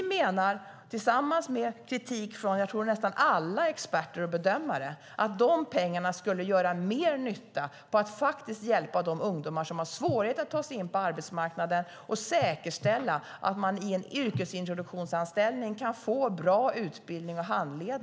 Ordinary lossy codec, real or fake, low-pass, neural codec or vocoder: none; real; none; none